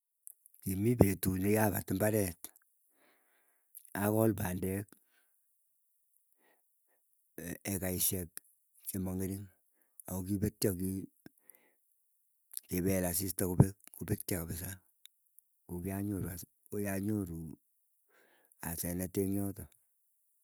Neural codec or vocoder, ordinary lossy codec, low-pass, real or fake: none; none; none; real